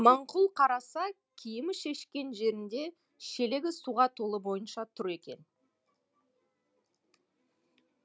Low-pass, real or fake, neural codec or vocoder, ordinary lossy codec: none; real; none; none